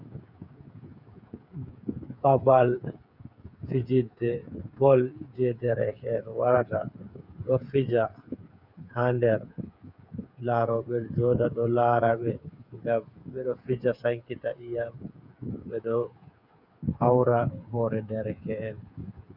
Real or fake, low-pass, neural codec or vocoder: fake; 5.4 kHz; codec, 16 kHz, 8 kbps, FreqCodec, smaller model